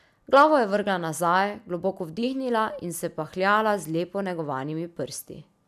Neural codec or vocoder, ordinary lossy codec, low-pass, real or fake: none; none; 14.4 kHz; real